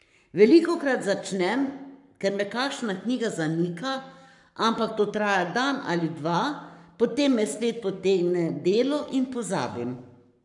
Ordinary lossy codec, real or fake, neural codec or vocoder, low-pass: none; fake; codec, 44.1 kHz, 7.8 kbps, Pupu-Codec; 10.8 kHz